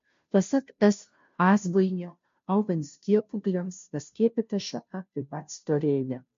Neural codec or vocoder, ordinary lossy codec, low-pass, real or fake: codec, 16 kHz, 0.5 kbps, FunCodec, trained on Chinese and English, 25 frames a second; MP3, 48 kbps; 7.2 kHz; fake